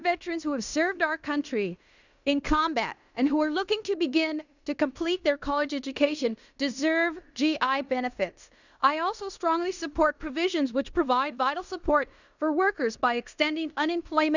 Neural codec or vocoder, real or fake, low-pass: codec, 16 kHz in and 24 kHz out, 0.9 kbps, LongCat-Audio-Codec, fine tuned four codebook decoder; fake; 7.2 kHz